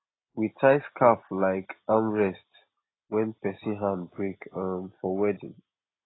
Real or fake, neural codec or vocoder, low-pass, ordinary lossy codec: real; none; 7.2 kHz; AAC, 16 kbps